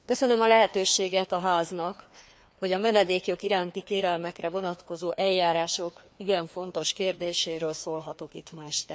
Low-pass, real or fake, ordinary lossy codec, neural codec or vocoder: none; fake; none; codec, 16 kHz, 2 kbps, FreqCodec, larger model